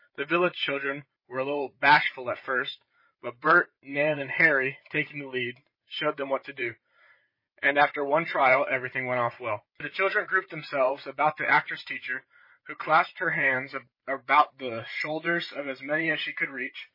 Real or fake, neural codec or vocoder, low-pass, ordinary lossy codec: fake; vocoder, 44.1 kHz, 128 mel bands every 512 samples, BigVGAN v2; 5.4 kHz; MP3, 24 kbps